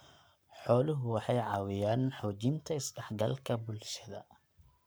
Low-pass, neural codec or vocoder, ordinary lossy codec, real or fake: none; codec, 44.1 kHz, 7.8 kbps, Pupu-Codec; none; fake